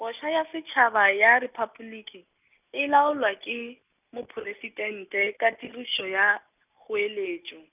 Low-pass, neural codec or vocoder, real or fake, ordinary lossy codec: 3.6 kHz; none; real; none